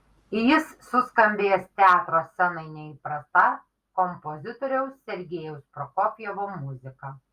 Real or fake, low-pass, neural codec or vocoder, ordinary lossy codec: real; 14.4 kHz; none; Opus, 32 kbps